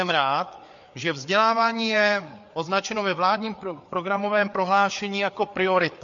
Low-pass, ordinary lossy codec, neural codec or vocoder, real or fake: 7.2 kHz; MP3, 48 kbps; codec, 16 kHz, 4 kbps, FreqCodec, larger model; fake